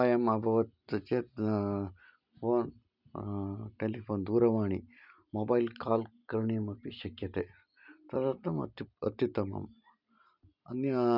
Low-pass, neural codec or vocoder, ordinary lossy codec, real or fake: 5.4 kHz; none; none; real